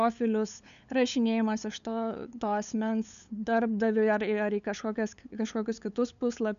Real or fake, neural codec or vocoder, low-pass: fake; codec, 16 kHz, 8 kbps, FunCodec, trained on LibriTTS, 25 frames a second; 7.2 kHz